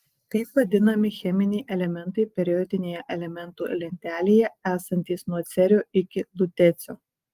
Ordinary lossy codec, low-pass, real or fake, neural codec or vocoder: Opus, 24 kbps; 14.4 kHz; real; none